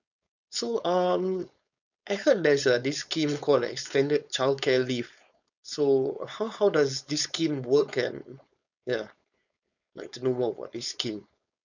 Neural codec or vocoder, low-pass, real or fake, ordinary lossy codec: codec, 16 kHz, 4.8 kbps, FACodec; 7.2 kHz; fake; none